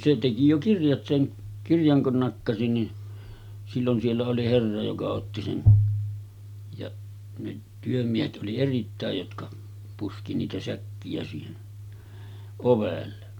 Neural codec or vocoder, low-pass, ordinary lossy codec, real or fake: none; 19.8 kHz; none; real